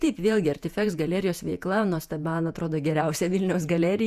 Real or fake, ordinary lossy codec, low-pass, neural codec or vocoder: fake; Opus, 64 kbps; 14.4 kHz; vocoder, 48 kHz, 128 mel bands, Vocos